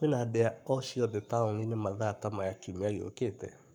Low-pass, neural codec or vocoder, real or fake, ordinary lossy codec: 19.8 kHz; codec, 44.1 kHz, 7.8 kbps, Pupu-Codec; fake; none